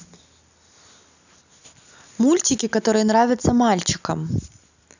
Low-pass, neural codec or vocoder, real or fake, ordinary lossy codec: 7.2 kHz; none; real; none